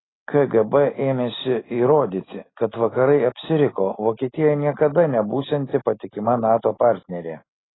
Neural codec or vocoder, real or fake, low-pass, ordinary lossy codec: none; real; 7.2 kHz; AAC, 16 kbps